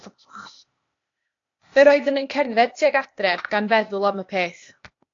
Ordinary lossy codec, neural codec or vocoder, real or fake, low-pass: AAC, 48 kbps; codec, 16 kHz, 0.8 kbps, ZipCodec; fake; 7.2 kHz